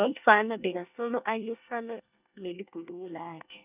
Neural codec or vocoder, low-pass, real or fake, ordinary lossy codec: codec, 24 kHz, 1 kbps, SNAC; 3.6 kHz; fake; none